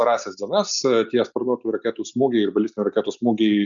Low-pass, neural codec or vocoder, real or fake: 7.2 kHz; none; real